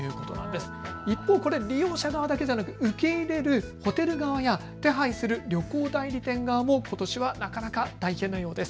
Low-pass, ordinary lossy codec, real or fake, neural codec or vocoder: none; none; real; none